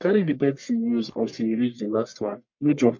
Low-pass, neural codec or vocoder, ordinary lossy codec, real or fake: 7.2 kHz; codec, 44.1 kHz, 1.7 kbps, Pupu-Codec; MP3, 48 kbps; fake